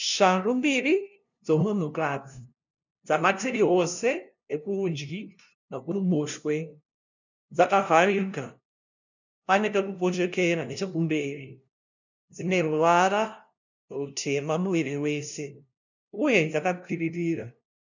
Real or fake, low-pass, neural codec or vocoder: fake; 7.2 kHz; codec, 16 kHz, 0.5 kbps, FunCodec, trained on LibriTTS, 25 frames a second